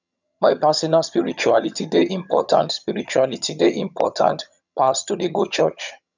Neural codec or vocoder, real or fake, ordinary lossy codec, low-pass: vocoder, 22.05 kHz, 80 mel bands, HiFi-GAN; fake; none; 7.2 kHz